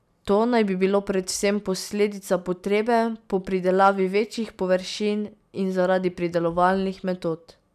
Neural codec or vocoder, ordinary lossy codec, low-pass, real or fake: none; none; 14.4 kHz; real